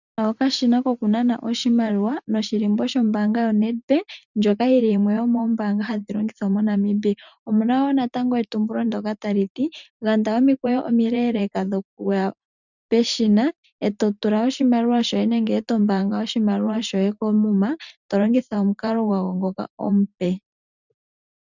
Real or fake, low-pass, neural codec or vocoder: fake; 7.2 kHz; vocoder, 44.1 kHz, 128 mel bands every 512 samples, BigVGAN v2